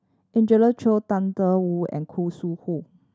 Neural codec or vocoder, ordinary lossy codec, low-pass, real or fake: none; none; none; real